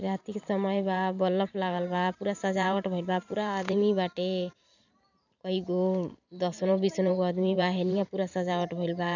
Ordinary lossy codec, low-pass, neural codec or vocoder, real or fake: none; 7.2 kHz; vocoder, 44.1 kHz, 128 mel bands every 512 samples, BigVGAN v2; fake